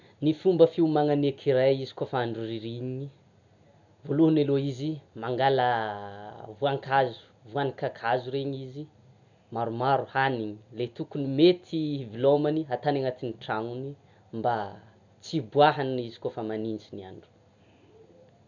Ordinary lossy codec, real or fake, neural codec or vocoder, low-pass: none; real; none; 7.2 kHz